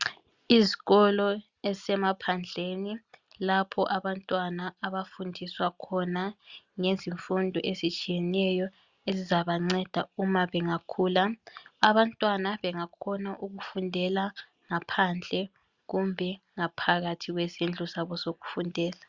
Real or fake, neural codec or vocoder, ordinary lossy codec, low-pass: real; none; Opus, 64 kbps; 7.2 kHz